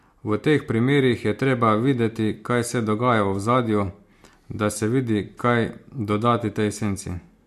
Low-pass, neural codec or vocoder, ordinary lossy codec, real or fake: 14.4 kHz; none; MP3, 64 kbps; real